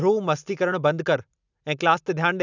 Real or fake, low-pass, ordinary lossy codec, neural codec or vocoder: real; 7.2 kHz; none; none